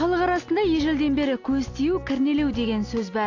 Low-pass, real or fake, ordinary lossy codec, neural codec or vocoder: 7.2 kHz; real; AAC, 48 kbps; none